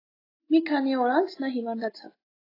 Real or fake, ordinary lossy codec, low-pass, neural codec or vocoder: real; AAC, 24 kbps; 5.4 kHz; none